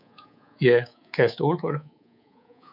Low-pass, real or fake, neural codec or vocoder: 5.4 kHz; fake; codec, 24 kHz, 3.1 kbps, DualCodec